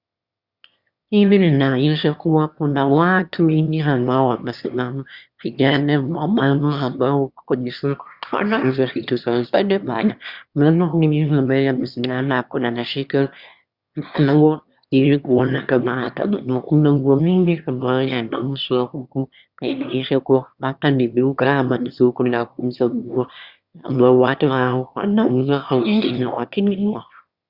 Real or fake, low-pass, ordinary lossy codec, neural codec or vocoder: fake; 5.4 kHz; Opus, 64 kbps; autoencoder, 22.05 kHz, a latent of 192 numbers a frame, VITS, trained on one speaker